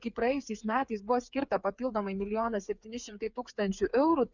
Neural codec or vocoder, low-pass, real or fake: codec, 16 kHz, 8 kbps, FreqCodec, smaller model; 7.2 kHz; fake